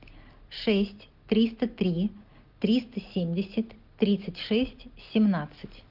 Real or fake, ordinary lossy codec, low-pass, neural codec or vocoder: real; Opus, 32 kbps; 5.4 kHz; none